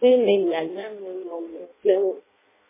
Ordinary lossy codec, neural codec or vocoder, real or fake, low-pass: MP3, 16 kbps; codec, 16 kHz in and 24 kHz out, 0.6 kbps, FireRedTTS-2 codec; fake; 3.6 kHz